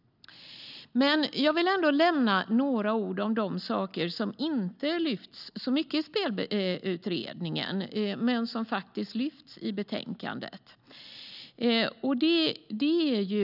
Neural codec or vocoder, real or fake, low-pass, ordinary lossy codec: none; real; 5.4 kHz; none